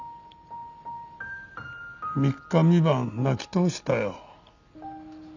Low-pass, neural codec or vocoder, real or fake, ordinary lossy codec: 7.2 kHz; none; real; none